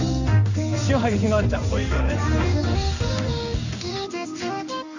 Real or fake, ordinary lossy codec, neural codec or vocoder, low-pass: fake; none; codec, 16 kHz in and 24 kHz out, 1 kbps, XY-Tokenizer; 7.2 kHz